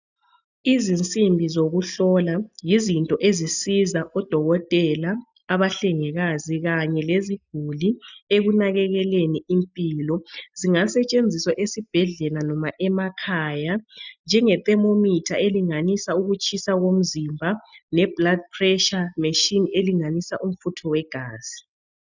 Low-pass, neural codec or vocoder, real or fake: 7.2 kHz; none; real